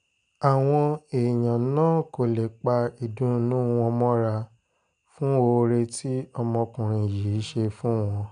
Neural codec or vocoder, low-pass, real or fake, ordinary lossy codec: none; 9.9 kHz; real; none